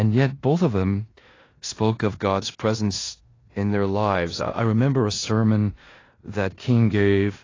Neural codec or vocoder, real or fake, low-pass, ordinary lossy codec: codec, 16 kHz in and 24 kHz out, 0.9 kbps, LongCat-Audio-Codec, four codebook decoder; fake; 7.2 kHz; AAC, 32 kbps